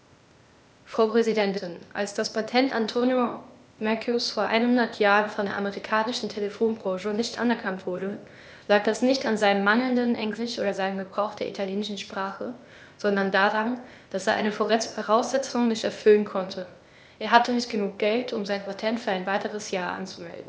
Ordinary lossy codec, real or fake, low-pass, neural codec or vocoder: none; fake; none; codec, 16 kHz, 0.8 kbps, ZipCodec